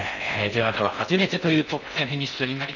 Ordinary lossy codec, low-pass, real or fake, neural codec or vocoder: none; 7.2 kHz; fake; codec, 16 kHz in and 24 kHz out, 0.6 kbps, FocalCodec, streaming, 4096 codes